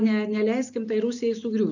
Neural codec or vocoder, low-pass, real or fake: none; 7.2 kHz; real